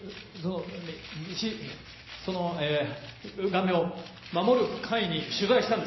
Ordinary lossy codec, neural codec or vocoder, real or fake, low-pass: MP3, 24 kbps; none; real; 7.2 kHz